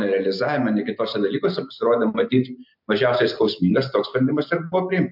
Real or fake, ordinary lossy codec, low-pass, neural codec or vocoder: real; MP3, 48 kbps; 5.4 kHz; none